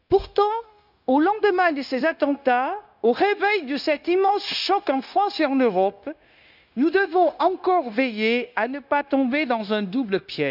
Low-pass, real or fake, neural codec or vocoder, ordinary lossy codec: 5.4 kHz; fake; codec, 16 kHz, 0.9 kbps, LongCat-Audio-Codec; none